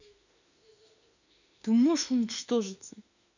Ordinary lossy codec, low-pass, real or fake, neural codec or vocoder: none; 7.2 kHz; fake; autoencoder, 48 kHz, 32 numbers a frame, DAC-VAE, trained on Japanese speech